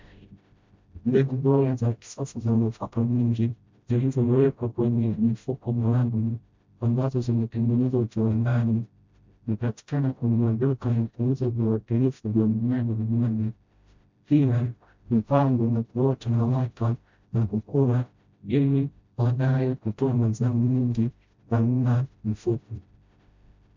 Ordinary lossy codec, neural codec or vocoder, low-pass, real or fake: MP3, 64 kbps; codec, 16 kHz, 0.5 kbps, FreqCodec, smaller model; 7.2 kHz; fake